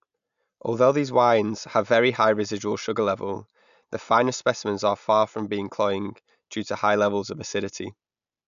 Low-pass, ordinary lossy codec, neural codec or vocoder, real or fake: 7.2 kHz; none; none; real